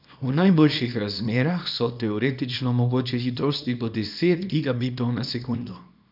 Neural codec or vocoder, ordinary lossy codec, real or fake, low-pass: codec, 24 kHz, 0.9 kbps, WavTokenizer, small release; none; fake; 5.4 kHz